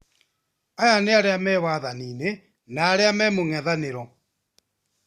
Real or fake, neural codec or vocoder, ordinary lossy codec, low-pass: real; none; Opus, 64 kbps; 14.4 kHz